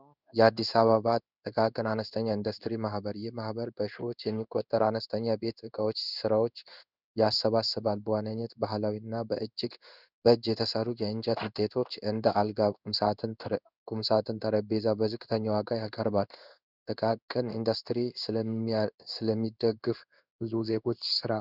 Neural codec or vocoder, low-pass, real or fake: codec, 16 kHz in and 24 kHz out, 1 kbps, XY-Tokenizer; 5.4 kHz; fake